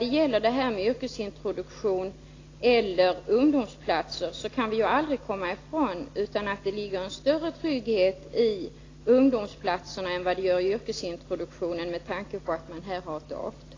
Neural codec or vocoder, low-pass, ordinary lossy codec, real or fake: none; 7.2 kHz; AAC, 32 kbps; real